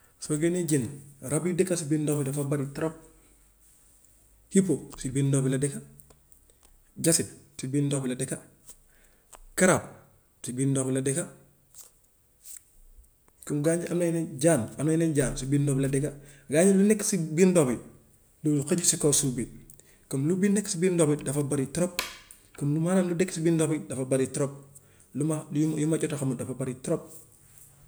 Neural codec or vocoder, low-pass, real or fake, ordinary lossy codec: none; none; real; none